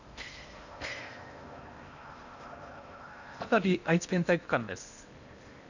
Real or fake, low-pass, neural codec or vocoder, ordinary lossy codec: fake; 7.2 kHz; codec, 16 kHz in and 24 kHz out, 0.8 kbps, FocalCodec, streaming, 65536 codes; none